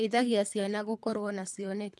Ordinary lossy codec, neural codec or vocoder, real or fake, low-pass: none; codec, 24 kHz, 3 kbps, HILCodec; fake; none